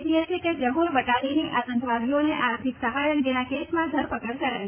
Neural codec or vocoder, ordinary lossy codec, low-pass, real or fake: vocoder, 44.1 kHz, 80 mel bands, Vocos; AAC, 24 kbps; 3.6 kHz; fake